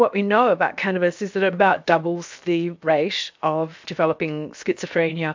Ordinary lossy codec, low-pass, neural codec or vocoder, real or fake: MP3, 64 kbps; 7.2 kHz; codec, 16 kHz, 0.7 kbps, FocalCodec; fake